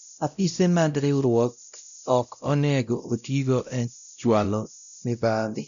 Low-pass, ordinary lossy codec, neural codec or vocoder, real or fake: 7.2 kHz; none; codec, 16 kHz, 0.5 kbps, X-Codec, WavLM features, trained on Multilingual LibriSpeech; fake